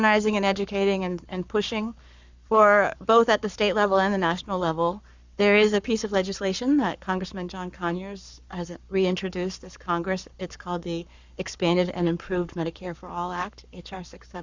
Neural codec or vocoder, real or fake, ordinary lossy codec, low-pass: codec, 44.1 kHz, 7.8 kbps, Pupu-Codec; fake; Opus, 64 kbps; 7.2 kHz